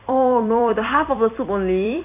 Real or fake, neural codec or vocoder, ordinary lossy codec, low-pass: fake; vocoder, 44.1 kHz, 128 mel bands every 256 samples, BigVGAN v2; AAC, 32 kbps; 3.6 kHz